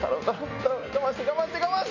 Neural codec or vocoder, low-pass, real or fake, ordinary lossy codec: none; 7.2 kHz; real; none